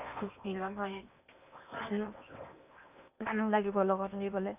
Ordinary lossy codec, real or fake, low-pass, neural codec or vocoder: none; fake; 3.6 kHz; codec, 16 kHz in and 24 kHz out, 0.8 kbps, FocalCodec, streaming, 65536 codes